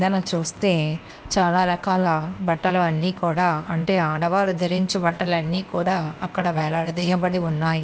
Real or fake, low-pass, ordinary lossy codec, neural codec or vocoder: fake; none; none; codec, 16 kHz, 0.8 kbps, ZipCodec